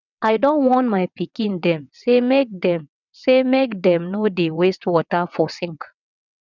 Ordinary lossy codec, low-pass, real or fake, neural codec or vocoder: none; 7.2 kHz; fake; vocoder, 22.05 kHz, 80 mel bands, WaveNeXt